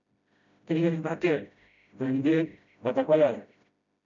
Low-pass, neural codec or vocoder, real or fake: 7.2 kHz; codec, 16 kHz, 0.5 kbps, FreqCodec, smaller model; fake